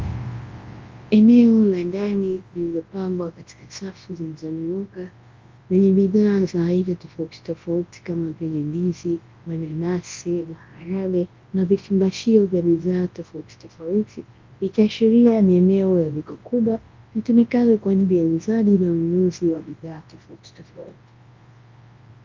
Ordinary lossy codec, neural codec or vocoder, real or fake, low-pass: Opus, 32 kbps; codec, 24 kHz, 0.9 kbps, WavTokenizer, large speech release; fake; 7.2 kHz